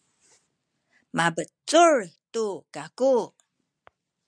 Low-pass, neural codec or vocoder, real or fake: 9.9 kHz; none; real